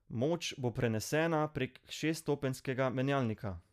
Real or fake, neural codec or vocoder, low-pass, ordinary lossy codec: real; none; 14.4 kHz; none